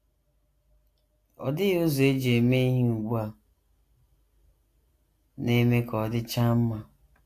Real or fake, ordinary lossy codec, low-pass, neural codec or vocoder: real; AAC, 64 kbps; 14.4 kHz; none